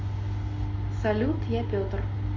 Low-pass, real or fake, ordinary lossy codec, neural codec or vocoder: 7.2 kHz; real; MP3, 32 kbps; none